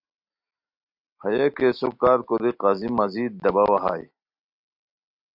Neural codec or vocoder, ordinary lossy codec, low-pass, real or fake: none; MP3, 48 kbps; 5.4 kHz; real